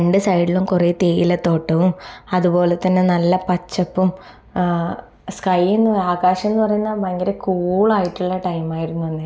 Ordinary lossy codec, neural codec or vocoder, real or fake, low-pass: none; none; real; none